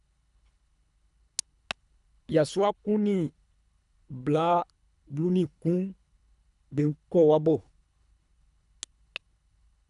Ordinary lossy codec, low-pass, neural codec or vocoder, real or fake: none; 10.8 kHz; codec, 24 kHz, 3 kbps, HILCodec; fake